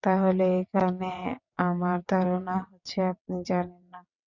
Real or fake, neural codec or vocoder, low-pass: fake; vocoder, 22.05 kHz, 80 mel bands, WaveNeXt; 7.2 kHz